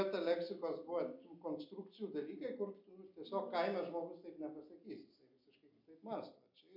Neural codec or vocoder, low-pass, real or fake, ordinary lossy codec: none; 5.4 kHz; real; MP3, 48 kbps